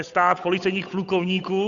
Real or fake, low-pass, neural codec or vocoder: fake; 7.2 kHz; codec, 16 kHz, 8 kbps, FunCodec, trained on Chinese and English, 25 frames a second